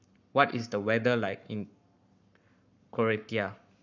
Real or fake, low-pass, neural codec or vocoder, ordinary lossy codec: fake; 7.2 kHz; codec, 44.1 kHz, 7.8 kbps, Pupu-Codec; none